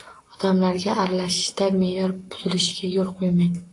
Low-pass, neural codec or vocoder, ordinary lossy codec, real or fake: 10.8 kHz; codec, 44.1 kHz, 7.8 kbps, Pupu-Codec; AAC, 48 kbps; fake